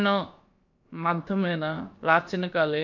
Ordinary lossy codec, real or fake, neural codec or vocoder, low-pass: MP3, 48 kbps; fake; codec, 16 kHz, about 1 kbps, DyCAST, with the encoder's durations; 7.2 kHz